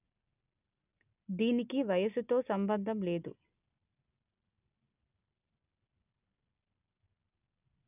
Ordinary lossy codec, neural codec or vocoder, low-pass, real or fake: none; none; 3.6 kHz; real